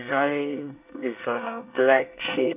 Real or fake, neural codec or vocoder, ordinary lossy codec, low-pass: fake; codec, 24 kHz, 1 kbps, SNAC; none; 3.6 kHz